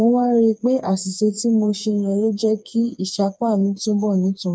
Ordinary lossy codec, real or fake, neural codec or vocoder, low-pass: none; fake; codec, 16 kHz, 4 kbps, FreqCodec, smaller model; none